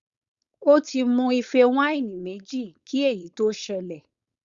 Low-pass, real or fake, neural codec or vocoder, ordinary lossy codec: 7.2 kHz; fake; codec, 16 kHz, 4.8 kbps, FACodec; Opus, 64 kbps